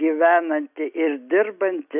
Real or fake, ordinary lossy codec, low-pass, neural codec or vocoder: real; AAC, 32 kbps; 3.6 kHz; none